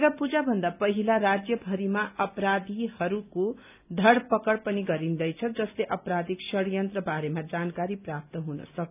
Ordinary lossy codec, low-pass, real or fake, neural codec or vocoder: none; 3.6 kHz; real; none